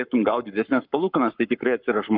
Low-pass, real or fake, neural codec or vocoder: 5.4 kHz; fake; codec, 44.1 kHz, 7.8 kbps, Pupu-Codec